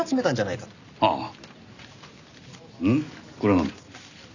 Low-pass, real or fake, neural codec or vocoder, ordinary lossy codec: 7.2 kHz; real; none; none